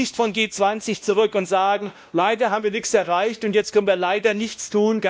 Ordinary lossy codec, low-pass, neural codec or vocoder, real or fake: none; none; codec, 16 kHz, 1 kbps, X-Codec, WavLM features, trained on Multilingual LibriSpeech; fake